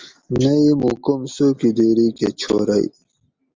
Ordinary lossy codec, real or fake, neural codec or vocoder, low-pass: Opus, 32 kbps; real; none; 7.2 kHz